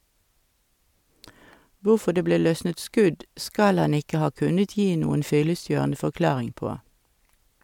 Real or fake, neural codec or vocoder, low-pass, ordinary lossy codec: real; none; 19.8 kHz; MP3, 96 kbps